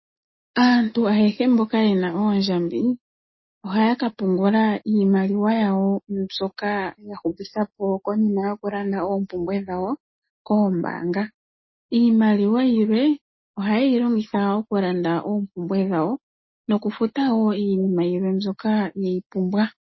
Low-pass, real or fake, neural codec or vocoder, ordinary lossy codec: 7.2 kHz; real; none; MP3, 24 kbps